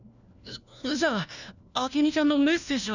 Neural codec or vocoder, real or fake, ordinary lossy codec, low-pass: codec, 16 kHz, 1 kbps, FunCodec, trained on LibriTTS, 50 frames a second; fake; none; 7.2 kHz